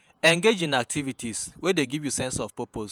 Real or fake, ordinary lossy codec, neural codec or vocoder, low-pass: fake; none; vocoder, 48 kHz, 128 mel bands, Vocos; none